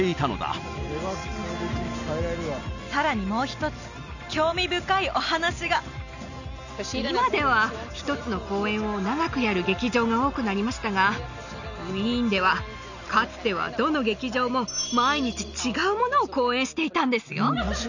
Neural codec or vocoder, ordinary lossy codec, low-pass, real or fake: none; none; 7.2 kHz; real